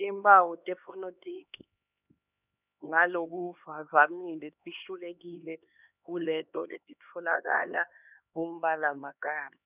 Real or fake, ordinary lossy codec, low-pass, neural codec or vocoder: fake; none; 3.6 kHz; codec, 16 kHz, 2 kbps, X-Codec, HuBERT features, trained on LibriSpeech